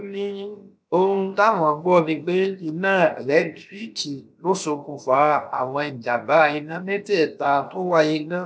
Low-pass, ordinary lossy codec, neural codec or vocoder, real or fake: none; none; codec, 16 kHz, 0.7 kbps, FocalCodec; fake